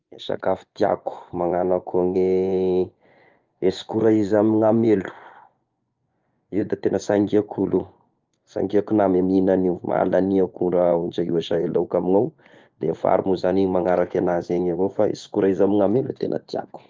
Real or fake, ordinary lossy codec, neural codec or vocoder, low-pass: fake; Opus, 32 kbps; vocoder, 24 kHz, 100 mel bands, Vocos; 7.2 kHz